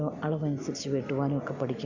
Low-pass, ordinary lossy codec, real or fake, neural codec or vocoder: 7.2 kHz; none; real; none